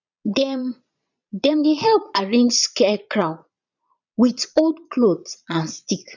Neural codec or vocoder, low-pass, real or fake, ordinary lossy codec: vocoder, 22.05 kHz, 80 mel bands, Vocos; 7.2 kHz; fake; none